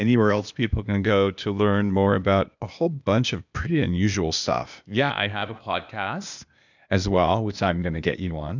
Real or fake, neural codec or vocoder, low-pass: fake; codec, 16 kHz, 0.8 kbps, ZipCodec; 7.2 kHz